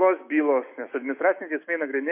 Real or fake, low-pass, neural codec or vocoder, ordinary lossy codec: fake; 3.6 kHz; autoencoder, 48 kHz, 128 numbers a frame, DAC-VAE, trained on Japanese speech; MP3, 24 kbps